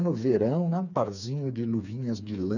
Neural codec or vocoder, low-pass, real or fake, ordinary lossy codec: codec, 16 kHz, 4 kbps, FreqCodec, smaller model; 7.2 kHz; fake; none